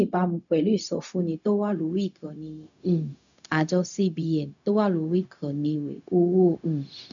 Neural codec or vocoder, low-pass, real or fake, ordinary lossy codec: codec, 16 kHz, 0.4 kbps, LongCat-Audio-Codec; 7.2 kHz; fake; none